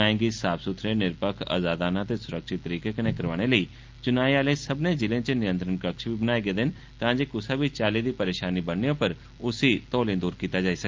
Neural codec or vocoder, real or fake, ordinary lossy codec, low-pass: none; real; Opus, 24 kbps; 7.2 kHz